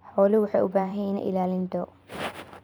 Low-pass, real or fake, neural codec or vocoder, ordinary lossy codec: none; fake; vocoder, 44.1 kHz, 128 mel bands every 512 samples, BigVGAN v2; none